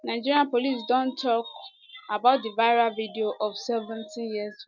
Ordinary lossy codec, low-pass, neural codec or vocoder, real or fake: none; 7.2 kHz; none; real